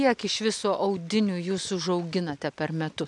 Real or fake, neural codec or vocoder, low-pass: real; none; 10.8 kHz